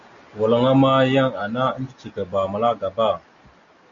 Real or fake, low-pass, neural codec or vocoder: real; 7.2 kHz; none